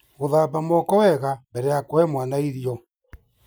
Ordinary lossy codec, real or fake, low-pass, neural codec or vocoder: none; real; none; none